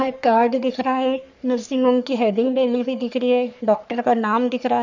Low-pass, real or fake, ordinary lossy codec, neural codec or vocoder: 7.2 kHz; fake; none; codec, 44.1 kHz, 3.4 kbps, Pupu-Codec